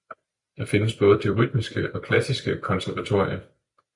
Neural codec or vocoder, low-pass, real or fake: vocoder, 44.1 kHz, 128 mel bands every 256 samples, BigVGAN v2; 10.8 kHz; fake